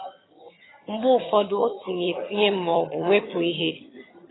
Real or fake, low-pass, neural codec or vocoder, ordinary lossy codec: fake; 7.2 kHz; vocoder, 22.05 kHz, 80 mel bands, HiFi-GAN; AAC, 16 kbps